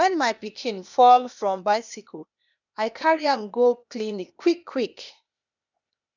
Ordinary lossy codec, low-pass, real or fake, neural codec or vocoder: none; 7.2 kHz; fake; codec, 16 kHz, 0.8 kbps, ZipCodec